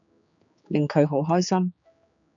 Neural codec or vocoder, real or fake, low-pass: codec, 16 kHz, 4 kbps, X-Codec, HuBERT features, trained on general audio; fake; 7.2 kHz